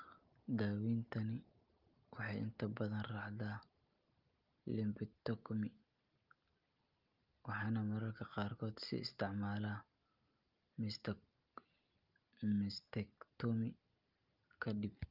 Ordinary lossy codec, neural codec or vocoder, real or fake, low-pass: Opus, 24 kbps; none; real; 5.4 kHz